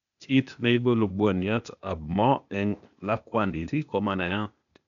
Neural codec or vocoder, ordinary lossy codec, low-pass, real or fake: codec, 16 kHz, 0.8 kbps, ZipCodec; none; 7.2 kHz; fake